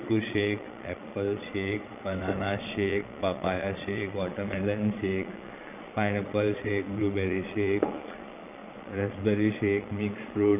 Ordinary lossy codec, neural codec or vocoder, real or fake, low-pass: none; vocoder, 22.05 kHz, 80 mel bands, Vocos; fake; 3.6 kHz